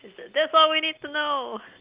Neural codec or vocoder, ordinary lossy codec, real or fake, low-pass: none; Opus, 32 kbps; real; 3.6 kHz